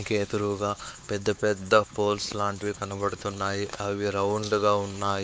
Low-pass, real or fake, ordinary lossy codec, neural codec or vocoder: none; fake; none; codec, 16 kHz, 4 kbps, X-Codec, WavLM features, trained on Multilingual LibriSpeech